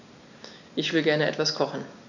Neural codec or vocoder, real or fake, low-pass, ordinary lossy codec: none; real; 7.2 kHz; none